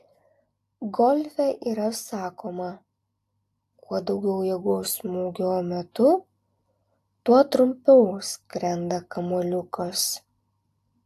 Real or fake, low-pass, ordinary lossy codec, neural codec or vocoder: real; 14.4 kHz; AAC, 64 kbps; none